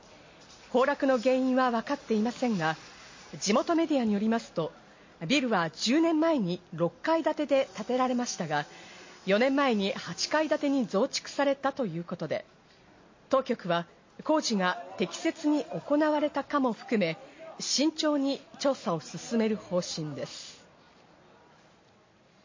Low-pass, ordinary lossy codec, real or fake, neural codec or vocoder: 7.2 kHz; MP3, 32 kbps; real; none